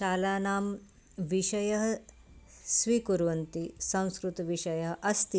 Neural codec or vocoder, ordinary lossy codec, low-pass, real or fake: none; none; none; real